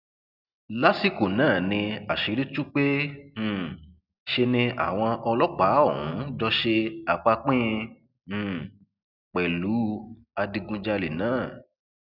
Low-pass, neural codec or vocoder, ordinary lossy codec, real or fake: 5.4 kHz; none; none; real